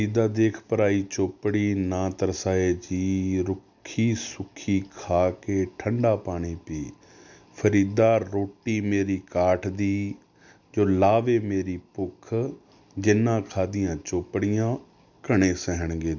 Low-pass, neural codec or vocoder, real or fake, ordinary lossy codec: 7.2 kHz; none; real; none